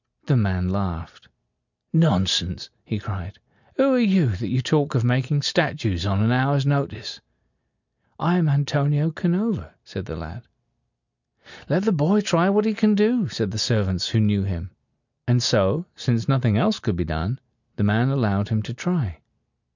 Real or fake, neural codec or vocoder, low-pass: real; none; 7.2 kHz